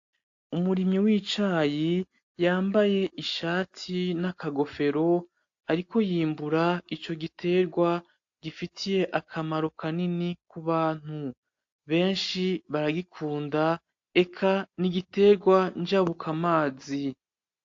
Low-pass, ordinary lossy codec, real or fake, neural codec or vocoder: 7.2 kHz; AAC, 48 kbps; real; none